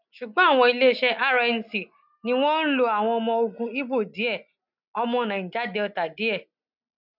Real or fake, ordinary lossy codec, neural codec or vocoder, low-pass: real; none; none; 5.4 kHz